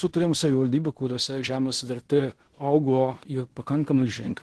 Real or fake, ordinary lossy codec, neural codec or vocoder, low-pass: fake; Opus, 16 kbps; codec, 16 kHz in and 24 kHz out, 0.9 kbps, LongCat-Audio-Codec, fine tuned four codebook decoder; 10.8 kHz